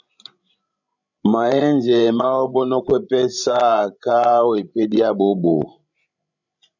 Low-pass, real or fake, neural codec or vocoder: 7.2 kHz; fake; codec, 16 kHz, 16 kbps, FreqCodec, larger model